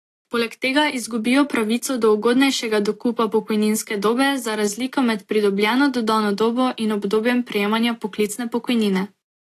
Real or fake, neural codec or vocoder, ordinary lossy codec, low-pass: real; none; AAC, 48 kbps; 14.4 kHz